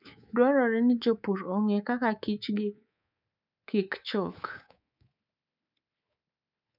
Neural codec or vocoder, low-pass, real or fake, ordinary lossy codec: codec, 24 kHz, 3.1 kbps, DualCodec; 5.4 kHz; fake; none